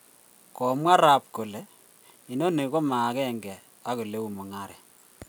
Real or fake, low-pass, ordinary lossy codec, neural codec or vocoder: real; none; none; none